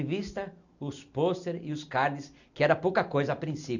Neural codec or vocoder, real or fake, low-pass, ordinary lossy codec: none; real; 7.2 kHz; none